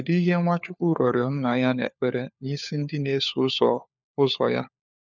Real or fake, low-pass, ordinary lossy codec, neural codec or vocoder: fake; 7.2 kHz; none; codec, 16 kHz, 4 kbps, FunCodec, trained on LibriTTS, 50 frames a second